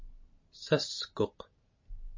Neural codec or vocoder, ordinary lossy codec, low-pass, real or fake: none; MP3, 32 kbps; 7.2 kHz; real